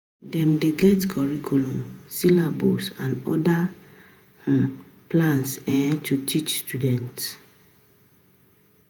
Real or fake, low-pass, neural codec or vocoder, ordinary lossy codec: fake; none; vocoder, 48 kHz, 128 mel bands, Vocos; none